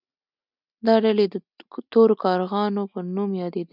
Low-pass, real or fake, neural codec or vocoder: 5.4 kHz; real; none